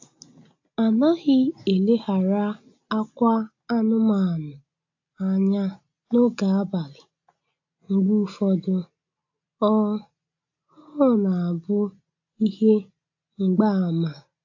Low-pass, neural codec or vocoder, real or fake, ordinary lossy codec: 7.2 kHz; none; real; AAC, 48 kbps